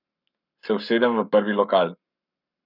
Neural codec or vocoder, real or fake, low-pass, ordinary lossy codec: codec, 44.1 kHz, 7.8 kbps, Pupu-Codec; fake; 5.4 kHz; none